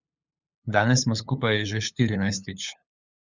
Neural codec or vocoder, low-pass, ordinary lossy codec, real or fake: codec, 16 kHz, 8 kbps, FunCodec, trained on LibriTTS, 25 frames a second; 7.2 kHz; Opus, 64 kbps; fake